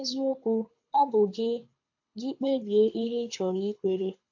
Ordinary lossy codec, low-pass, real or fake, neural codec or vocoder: AAC, 48 kbps; 7.2 kHz; fake; codec, 24 kHz, 6 kbps, HILCodec